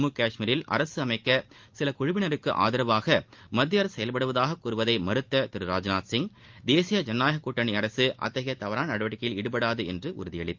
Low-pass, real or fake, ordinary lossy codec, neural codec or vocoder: 7.2 kHz; real; Opus, 32 kbps; none